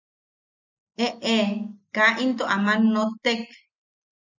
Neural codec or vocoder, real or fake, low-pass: none; real; 7.2 kHz